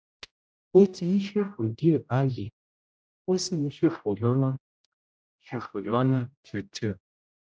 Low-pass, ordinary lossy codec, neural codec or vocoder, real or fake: none; none; codec, 16 kHz, 0.5 kbps, X-Codec, HuBERT features, trained on general audio; fake